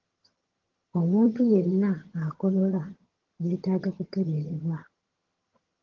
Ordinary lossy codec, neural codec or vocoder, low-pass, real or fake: Opus, 16 kbps; vocoder, 22.05 kHz, 80 mel bands, HiFi-GAN; 7.2 kHz; fake